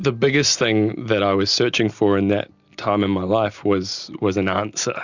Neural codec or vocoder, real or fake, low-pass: none; real; 7.2 kHz